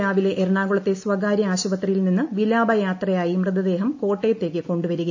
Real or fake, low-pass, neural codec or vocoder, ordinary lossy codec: real; 7.2 kHz; none; AAC, 48 kbps